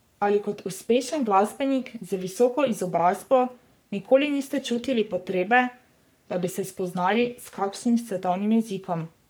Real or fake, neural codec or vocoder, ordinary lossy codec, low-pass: fake; codec, 44.1 kHz, 3.4 kbps, Pupu-Codec; none; none